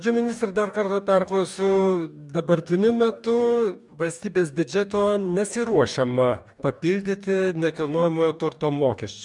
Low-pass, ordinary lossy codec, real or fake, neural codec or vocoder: 10.8 kHz; MP3, 96 kbps; fake; codec, 44.1 kHz, 2.6 kbps, DAC